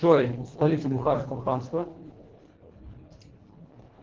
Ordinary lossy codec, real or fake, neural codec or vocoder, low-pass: Opus, 16 kbps; fake; codec, 24 kHz, 1.5 kbps, HILCodec; 7.2 kHz